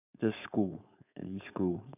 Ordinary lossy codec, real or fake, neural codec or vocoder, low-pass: none; fake; codec, 16 kHz, 4.8 kbps, FACodec; 3.6 kHz